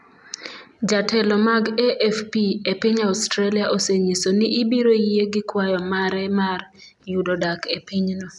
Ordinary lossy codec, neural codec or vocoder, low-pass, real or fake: none; none; 10.8 kHz; real